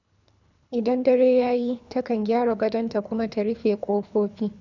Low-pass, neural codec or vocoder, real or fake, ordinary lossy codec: 7.2 kHz; codec, 24 kHz, 3 kbps, HILCodec; fake; none